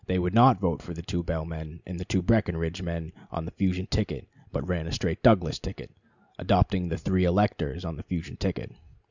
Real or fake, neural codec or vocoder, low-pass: real; none; 7.2 kHz